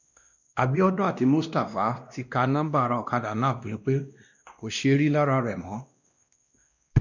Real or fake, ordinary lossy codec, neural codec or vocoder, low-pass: fake; none; codec, 16 kHz, 1 kbps, X-Codec, WavLM features, trained on Multilingual LibriSpeech; 7.2 kHz